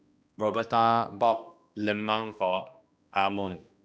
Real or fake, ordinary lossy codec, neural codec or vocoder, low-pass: fake; none; codec, 16 kHz, 1 kbps, X-Codec, HuBERT features, trained on balanced general audio; none